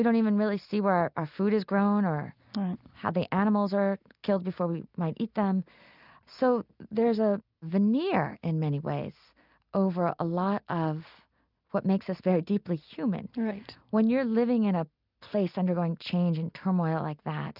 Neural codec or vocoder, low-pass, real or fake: none; 5.4 kHz; real